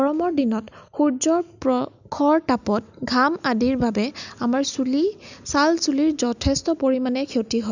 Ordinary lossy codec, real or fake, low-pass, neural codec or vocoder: none; real; 7.2 kHz; none